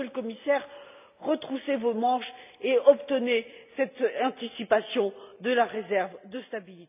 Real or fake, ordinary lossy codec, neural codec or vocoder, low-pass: real; none; none; 3.6 kHz